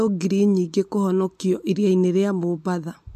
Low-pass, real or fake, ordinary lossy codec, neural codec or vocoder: 14.4 kHz; real; MP3, 64 kbps; none